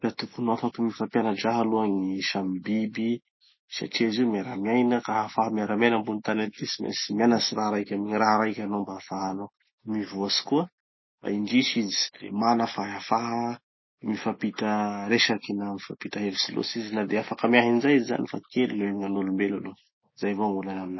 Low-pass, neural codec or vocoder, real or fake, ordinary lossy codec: 7.2 kHz; none; real; MP3, 24 kbps